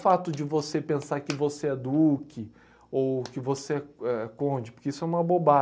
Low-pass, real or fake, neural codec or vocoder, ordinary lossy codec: none; real; none; none